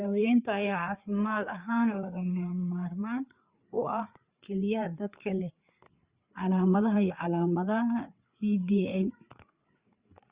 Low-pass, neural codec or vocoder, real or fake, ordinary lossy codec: 3.6 kHz; codec, 16 kHz, 4 kbps, FreqCodec, larger model; fake; Opus, 64 kbps